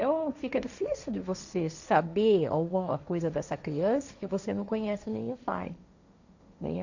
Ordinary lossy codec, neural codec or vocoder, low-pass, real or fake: none; codec, 16 kHz, 1.1 kbps, Voila-Tokenizer; 7.2 kHz; fake